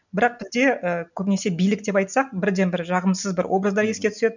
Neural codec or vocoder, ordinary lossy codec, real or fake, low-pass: none; none; real; 7.2 kHz